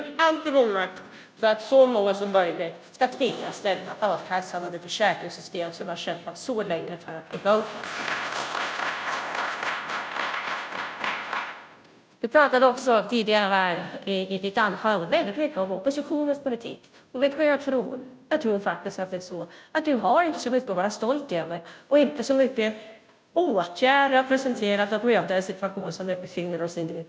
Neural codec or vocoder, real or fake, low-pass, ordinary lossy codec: codec, 16 kHz, 0.5 kbps, FunCodec, trained on Chinese and English, 25 frames a second; fake; none; none